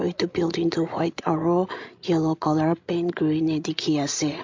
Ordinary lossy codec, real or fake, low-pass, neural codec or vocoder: MP3, 48 kbps; fake; 7.2 kHz; codec, 16 kHz, 16 kbps, FreqCodec, larger model